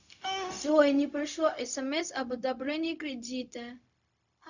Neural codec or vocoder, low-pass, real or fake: codec, 16 kHz, 0.4 kbps, LongCat-Audio-Codec; 7.2 kHz; fake